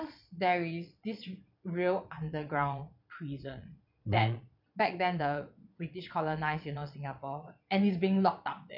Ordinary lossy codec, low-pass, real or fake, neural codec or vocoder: none; 5.4 kHz; fake; vocoder, 22.05 kHz, 80 mel bands, Vocos